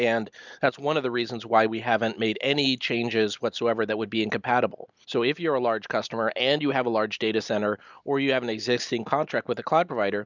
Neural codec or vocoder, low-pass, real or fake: none; 7.2 kHz; real